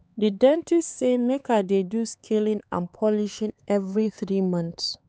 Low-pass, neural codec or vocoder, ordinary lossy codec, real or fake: none; codec, 16 kHz, 4 kbps, X-Codec, HuBERT features, trained on LibriSpeech; none; fake